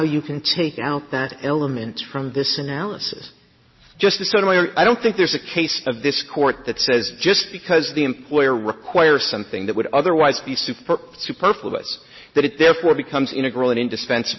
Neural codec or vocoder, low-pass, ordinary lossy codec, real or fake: none; 7.2 kHz; MP3, 24 kbps; real